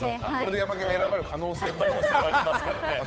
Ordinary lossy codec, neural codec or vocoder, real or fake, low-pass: none; codec, 16 kHz, 8 kbps, FunCodec, trained on Chinese and English, 25 frames a second; fake; none